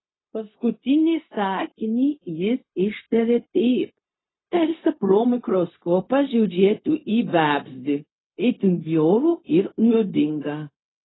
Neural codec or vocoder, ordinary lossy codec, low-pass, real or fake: codec, 16 kHz, 0.4 kbps, LongCat-Audio-Codec; AAC, 16 kbps; 7.2 kHz; fake